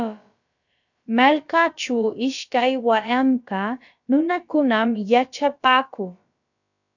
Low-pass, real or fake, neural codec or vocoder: 7.2 kHz; fake; codec, 16 kHz, about 1 kbps, DyCAST, with the encoder's durations